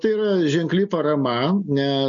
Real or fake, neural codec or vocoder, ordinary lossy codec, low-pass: real; none; MP3, 96 kbps; 7.2 kHz